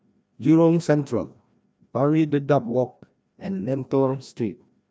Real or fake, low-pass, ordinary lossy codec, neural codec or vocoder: fake; none; none; codec, 16 kHz, 1 kbps, FreqCodec, larger model